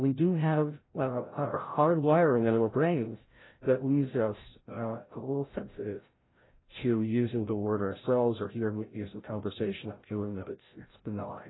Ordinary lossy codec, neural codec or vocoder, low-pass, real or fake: AAC, 16 kbps; codec, 16 kHz, 0.5 kbps, FreqCodec, larger model; 7.2 kHz; fake